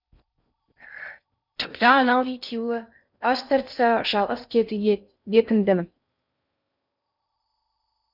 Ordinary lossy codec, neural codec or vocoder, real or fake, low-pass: none; codec, 16 kHz in and 24 kHz out, 0.6 kbps, FocalCodec, streaming, 4096 codes; fake; 5.4 kHz